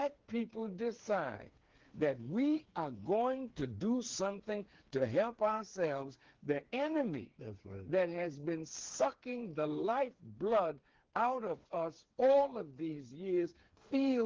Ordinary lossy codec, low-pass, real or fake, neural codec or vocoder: Opus, 16 kbps; 7.2 kHz; fake; codec, 16 kHz, 4 kbps, FreqCodec, smaller model